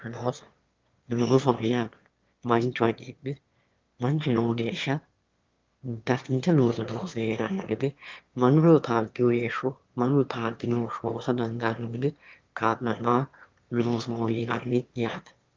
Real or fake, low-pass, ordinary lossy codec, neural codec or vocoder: fake; 7.2 kHz; Opus, 32 kbps; autoencoder, 22.05 kHz, a latent of 192 numbers a frame, VITS, trained on one speaker